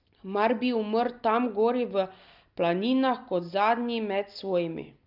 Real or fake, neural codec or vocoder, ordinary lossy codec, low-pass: real; none; Opus, 24 kbps; 5.4 kHz